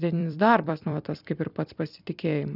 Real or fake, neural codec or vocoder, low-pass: fake; vocoder, 44.1 kHz, 128 mel bands every 256 samples, BigVGAN v2; 5.4 kHz